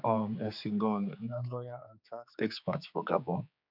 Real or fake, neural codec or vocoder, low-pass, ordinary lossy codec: fake; codec, 16 kHz, 4 kbps, X-Codec, HuBERT features, trained on general audio; 5.4 kHz; none